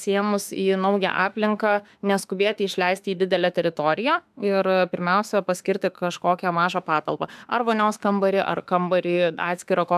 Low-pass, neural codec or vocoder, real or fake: 14.4 kHz; autoencoder, 48 kHz, 32 numbers a frame, DAC-VAE, trained on Japanese speech; fake